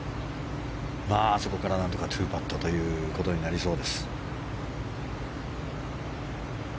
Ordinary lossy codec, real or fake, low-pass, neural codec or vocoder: none; real; none; none